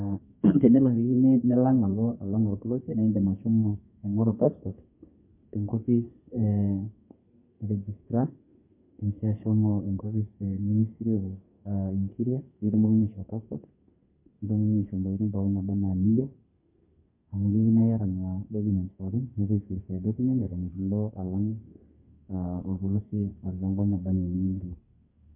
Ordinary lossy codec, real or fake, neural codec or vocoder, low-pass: MP3, 24 kbps; fake; codec, 32 kHz, 1.9 kbps, SNAC; 3.6 kHz